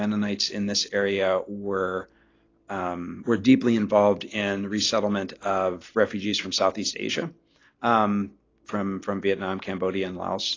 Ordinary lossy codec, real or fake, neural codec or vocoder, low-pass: AAC, 32 kbps; real; none; 7.2 kHz